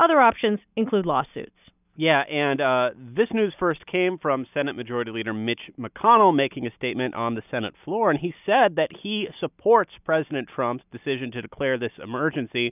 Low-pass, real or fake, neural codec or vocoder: 3.6 kHz; real; none